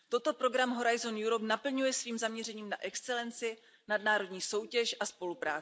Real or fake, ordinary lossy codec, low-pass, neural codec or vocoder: real; none; none; none